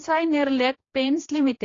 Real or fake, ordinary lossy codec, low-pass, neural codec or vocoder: fake; AAC, 32 kbps; 7.2 kHz; codec, 16 kHz, 1 kbps, X-Codec, HuBERT features, trained on general audio